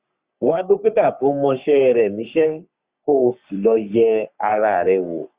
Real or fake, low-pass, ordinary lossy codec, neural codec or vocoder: fake; 3.6 kHz; Opus, 64 kbps; codec, 44.1 kHz, 3.4 kbps, Pupu-Codec